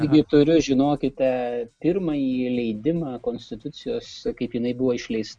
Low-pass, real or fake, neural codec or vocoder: 9.9 kHz; real; none